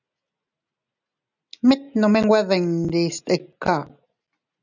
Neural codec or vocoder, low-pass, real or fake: none; 7.2 kHz; real